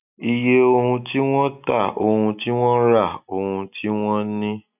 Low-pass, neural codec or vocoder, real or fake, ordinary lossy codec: 3.6 kHz; none; real; none